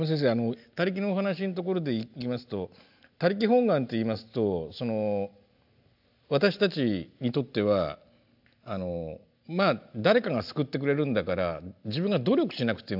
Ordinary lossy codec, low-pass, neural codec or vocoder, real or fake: AAC, 48 kbps; 5.4 kHz; none; real